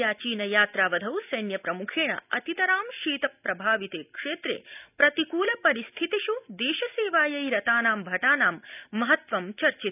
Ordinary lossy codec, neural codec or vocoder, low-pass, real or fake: none; none; 3.6 kHz; real